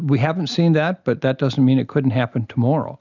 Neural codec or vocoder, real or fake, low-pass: none; real; 7.2 kHz